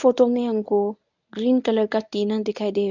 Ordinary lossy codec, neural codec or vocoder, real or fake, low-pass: none; codec, 24 kHz, 0.9 kbps, WavTokenizer, medium speech release version 1; fake; 7.2 kHz